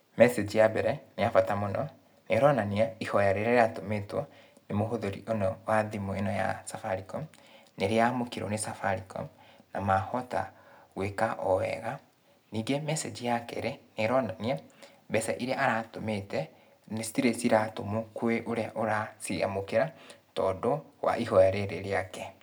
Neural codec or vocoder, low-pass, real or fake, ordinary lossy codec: none; none; real; none